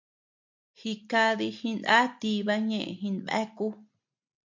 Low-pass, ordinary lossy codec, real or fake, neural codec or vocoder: 7.2 kHz; MP3, 48 kbps; real; none